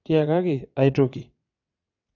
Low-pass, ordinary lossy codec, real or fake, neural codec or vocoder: 7.2 kHz; none; fake; vocoder, 22.05 kHz, 80 mel bands, WaveNeXt